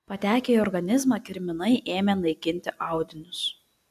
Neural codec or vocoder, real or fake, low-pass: none; real; 14.4 kHz